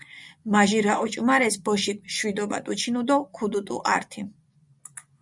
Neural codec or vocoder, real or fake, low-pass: vocoder, 24 kHz, 100 mel bands, Vocos; fake; 10.8 kHz